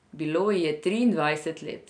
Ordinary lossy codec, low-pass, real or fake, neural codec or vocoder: none; 9.9 kHz; real; none